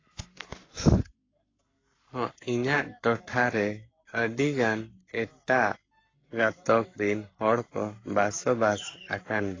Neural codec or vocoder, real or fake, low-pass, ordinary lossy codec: codec, 44.1 kHz, 7.8 kbps, Pupu-Codec; fake; 7.2 kHz; AAC, 32 kbps